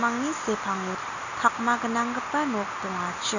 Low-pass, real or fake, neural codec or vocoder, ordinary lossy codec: 7.2 kHz; real; none; none